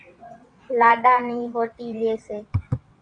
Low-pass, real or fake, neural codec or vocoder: 9.9 kHz; fake; vocoder, 22.05 kHz, 80 mel bands, WaveNeXt